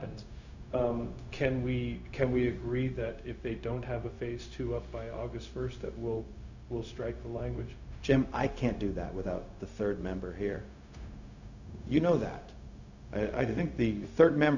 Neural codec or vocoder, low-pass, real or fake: codec, 16 kHz, 0.4 kbps, LongCat-Audio-Codec; 7.2 kHz; fake